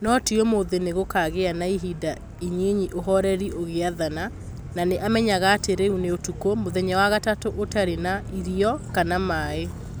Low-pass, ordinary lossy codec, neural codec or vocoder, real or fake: none; none; none; real